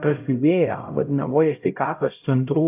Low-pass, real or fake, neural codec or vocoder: 3.6 kHz; fake; codec, 16 kHz, 0.5 kbps, X-Codec, HuBERT features, trained on LibriSpeech